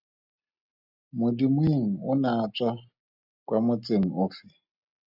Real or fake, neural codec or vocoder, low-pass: real; none; 5.4 kHz